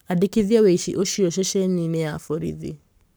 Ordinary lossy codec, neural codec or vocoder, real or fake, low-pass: none; codec, 44.1 kHz, 7.8 kbps, Pupu-Codec; fake; none